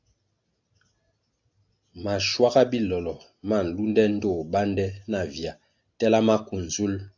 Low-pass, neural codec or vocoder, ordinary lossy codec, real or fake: 7.2 kHz; none; AAC, 48 kbps; real